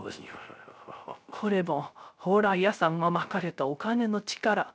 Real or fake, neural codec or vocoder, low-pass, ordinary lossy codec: fake; codec, 16 kHz, 0.3 kbps, FocalCodec; none; none